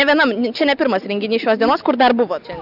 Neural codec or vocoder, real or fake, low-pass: none; real; 5.4 kHz